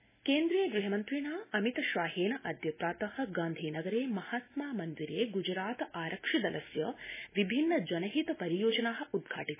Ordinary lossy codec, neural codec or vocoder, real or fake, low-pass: MP3, 16 kbps; none; real; 3.6 kHz